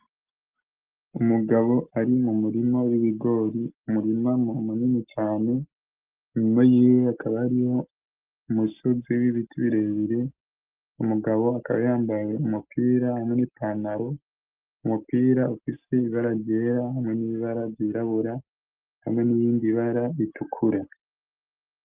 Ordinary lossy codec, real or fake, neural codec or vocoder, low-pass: Opus, 24 kbps; real; none; 3.6 kHz